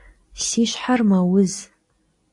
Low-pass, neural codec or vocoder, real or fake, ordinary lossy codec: 10.8 kHz; none; real; AAC, 48 kbps